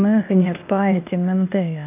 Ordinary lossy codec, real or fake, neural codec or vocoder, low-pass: none; fake; codec, 16 kHz, 0.8 kbps, ZipCodec; 3.6 kHz